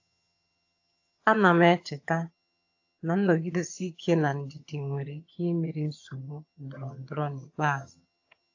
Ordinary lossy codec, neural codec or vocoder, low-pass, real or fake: AAC, 48 kbps; vocoder, 22.05 kHz, 80 mel bands, HiFi-GAN; 7.2 kHz; fake